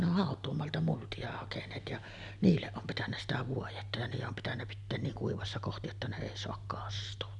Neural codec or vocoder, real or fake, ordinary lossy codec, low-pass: none; real; none; 10.8 kHz